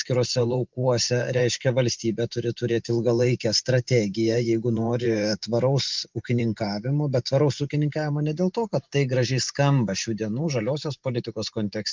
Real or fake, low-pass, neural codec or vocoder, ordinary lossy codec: fake; 7.2 kHz; vocoder, 44.1 kHz, 128 mel bands every 512 samples, BigVGAN v2; Opus, 32 kbps